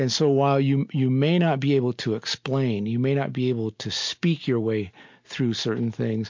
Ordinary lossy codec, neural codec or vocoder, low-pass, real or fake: MP3, 48 kbps; none; 7.2 kHz; real